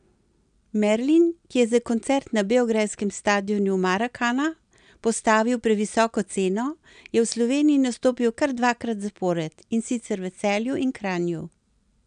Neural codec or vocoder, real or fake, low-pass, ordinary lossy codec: none; real; 9.9 kHz; none